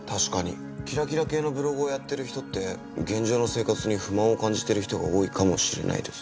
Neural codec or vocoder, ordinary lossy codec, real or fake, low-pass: none; none; real; none